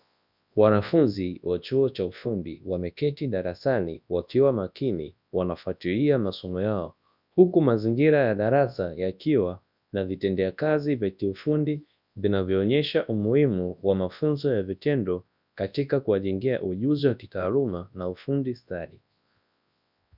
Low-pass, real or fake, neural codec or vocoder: 5.4 kHz; fake; codec, 24 kHz, 0.9 kbps, WavTokenizer, large speech release